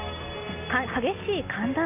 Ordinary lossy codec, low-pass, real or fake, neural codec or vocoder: none; 3.6 kHz; real; none